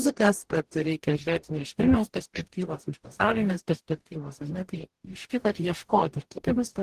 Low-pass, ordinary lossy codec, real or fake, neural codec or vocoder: 14.4 kHz; Opus, 16 kbps; fake; codec, 44.1 kHz, 0.9 kbps, DAC